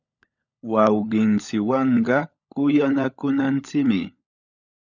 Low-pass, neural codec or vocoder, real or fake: 7.2 kHz; codec, 16 kHz, 16 kbps, FunCodec, trained on LibriTTS, 50 frames a second; fake